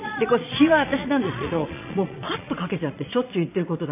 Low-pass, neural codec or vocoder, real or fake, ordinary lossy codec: 3.6 kHz; vocoder, 22.05 kHz, 80 mel bands, Vocos; fake; none